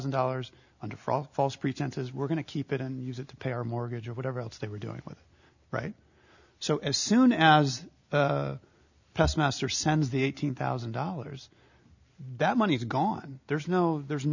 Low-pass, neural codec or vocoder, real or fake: 7.2 kHz; none; real